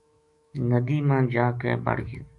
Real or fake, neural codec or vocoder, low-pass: fake; autoencoder, 48 kHz, 128 numbers a frame, DAC-VAE, trained on Japanese speech; 10.8 kHz